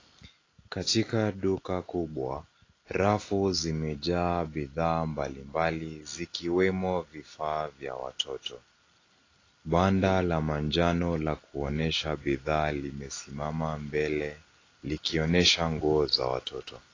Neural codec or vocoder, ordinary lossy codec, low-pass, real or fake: none; AAC, 32 kbps; 7.2 kHz; real